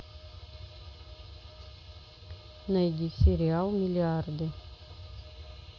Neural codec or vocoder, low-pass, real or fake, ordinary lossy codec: none; none; real; none